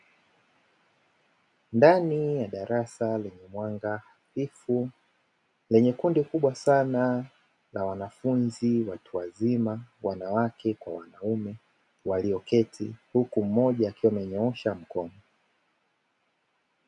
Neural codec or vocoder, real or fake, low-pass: none; real; 10.8 kHz